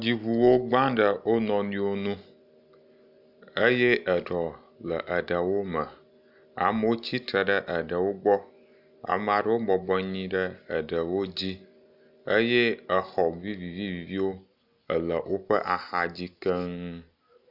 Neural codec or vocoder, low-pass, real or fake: none; 5.4 kHz; real